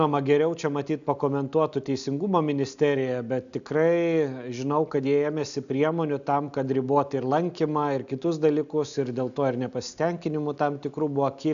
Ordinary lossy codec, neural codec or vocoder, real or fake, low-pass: MP3, 96 kbps; none; real; 7.2 kHz